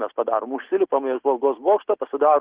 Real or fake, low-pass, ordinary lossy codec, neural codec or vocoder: real; 3.6 kHz; Opus, 16 kbps; none